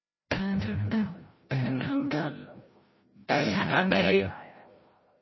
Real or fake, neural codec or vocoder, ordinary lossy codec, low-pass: fake; codec, 16 kHz, 0.5 kbps, FreqCodec, larger model; MP3, 24 kbps; 7.2 kHz